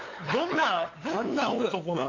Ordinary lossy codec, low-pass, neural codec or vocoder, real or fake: AAC, 32 kbps; 7.2 kHz; codec, 16 kHz, 8 kbps, FunCodec, trained on LibriTTS, 25 frames a second; fake